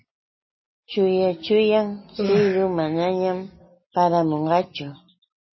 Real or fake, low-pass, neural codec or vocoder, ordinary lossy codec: real; 7.2 kHz; none; MP3, 24 kbps